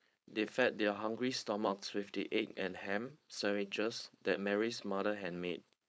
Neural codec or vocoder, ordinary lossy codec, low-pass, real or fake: codec, 16 kHz, 4.8 kbps, FACodec; none; none; fake